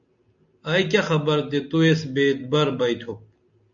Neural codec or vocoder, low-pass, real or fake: none; 7.2 kHz; real